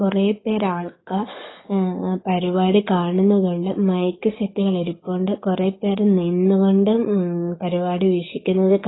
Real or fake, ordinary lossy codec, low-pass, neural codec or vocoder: fake; AAC, 16 kbps; 7.2 kHz; codec, 44.1 kHz, 7.8 kbps, DAC